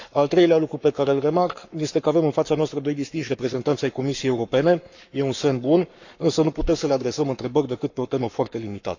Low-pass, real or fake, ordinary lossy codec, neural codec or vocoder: 7.2 kHz; fake; none; codec, 44.1 kHz, 7.8 kbps, Pupu-Codec